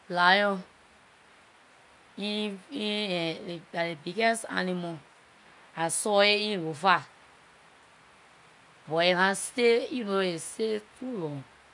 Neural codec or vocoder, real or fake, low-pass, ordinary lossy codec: none; real; 10.8 kHz; none